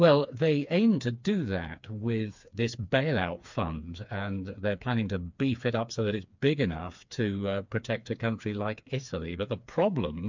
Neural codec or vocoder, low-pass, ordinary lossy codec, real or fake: codec, 16 kHz, 4 kbps, FreqCodec, smaller model; 7.2 kHz; MP3, 64 kbps; fake